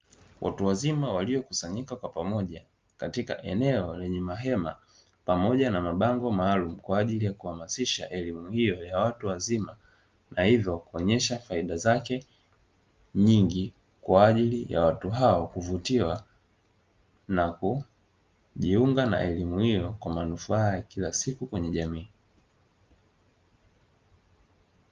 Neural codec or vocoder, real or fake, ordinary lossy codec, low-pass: none; real; Opus, 32 kbps; 7.2 kHz